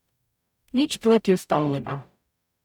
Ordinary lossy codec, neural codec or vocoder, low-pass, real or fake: none; codec, 44.1 kHz, 0.9 kbps, DAC; 19.8 kHz; fake